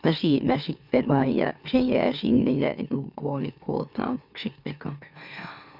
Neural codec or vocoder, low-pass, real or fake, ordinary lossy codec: autoencoder, 44.1 kHz, a latent of 192 numbers a frame, MeloTTS; 5.4 kHz; fake; Opus, 64 kbps